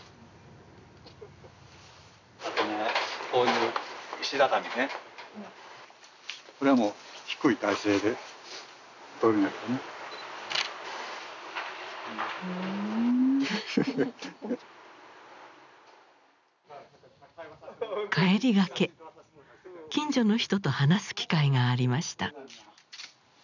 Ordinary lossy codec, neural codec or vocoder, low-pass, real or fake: none; none; 7.2 kHz; real